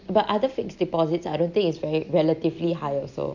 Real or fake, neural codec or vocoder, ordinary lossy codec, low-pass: real; none; none; 7.2 kHz